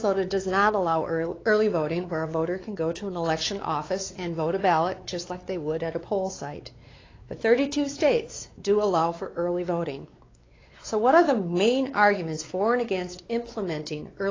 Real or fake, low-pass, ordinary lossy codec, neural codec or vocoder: fake; 7.2 kHz; AAC, 32 kbps; codec, 16 kHz, 4 kbps, X-Codec, WavLM features, trained on Multilingual LibriSpeech